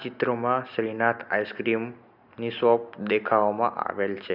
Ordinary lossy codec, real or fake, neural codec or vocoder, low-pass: none; real; none; 5.4 kHz